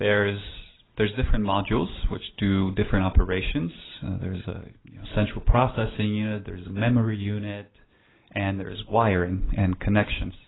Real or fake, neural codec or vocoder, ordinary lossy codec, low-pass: real; none; AAC, 16 kbps; 7.2 kHz